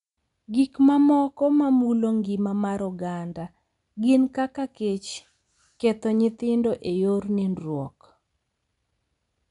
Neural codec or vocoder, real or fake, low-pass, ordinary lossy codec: none; real; 10.8 kHz; none